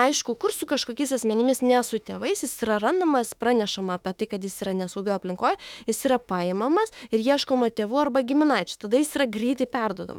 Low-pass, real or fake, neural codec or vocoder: 19.8 kHz; fake; autoencoder, 48 kHz, 32 numbers a frame, DAC-VAE, trained on Japanese speech